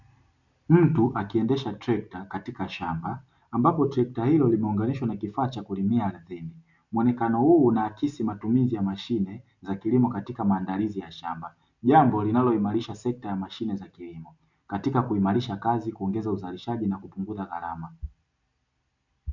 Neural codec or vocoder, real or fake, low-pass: none; real; 7.2 kHz